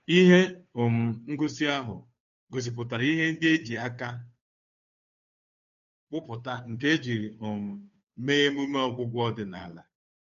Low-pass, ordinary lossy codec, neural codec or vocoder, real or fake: 7.2 kHz; none; codec, 16 kHz, 2 kbps, FunCodec, trained on Chinese and English, 25 frames a second; fake